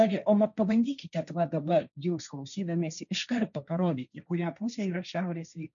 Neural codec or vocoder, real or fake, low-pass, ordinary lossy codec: codec, 16 kHz, 1.1 kbps, Voila-Tokenizer; fake; 7.2 kHz; MP3, 96 kbps